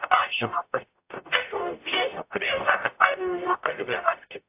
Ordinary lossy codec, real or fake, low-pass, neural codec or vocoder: AAC, 32 kbps; fake; 3.6 kHz; codec, 44.1 kHz, 0.9 kbps, DAC